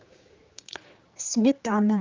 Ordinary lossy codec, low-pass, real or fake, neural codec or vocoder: Opus, 24 kbps; 7.2 kHz; fake; codec, 16 kHz, 4 kbps, X-Codec, HuBERT features, trained on general audio